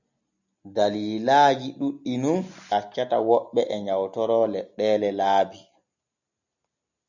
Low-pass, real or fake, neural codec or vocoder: 7.2 kHz; real; none